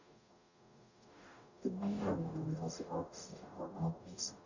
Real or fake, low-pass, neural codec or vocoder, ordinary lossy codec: fake; 7.2 kHz; codec, 44.1 kHz, 0.9 kbps, DAC; none